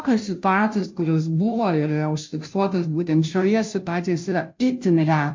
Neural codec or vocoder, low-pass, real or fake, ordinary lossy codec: codec, 16 kHz, 0.5 kbps, FunCodec, trained on Chinese and English, 25 frames a second; 7.2 kHz; fake; MP3, 48 kbps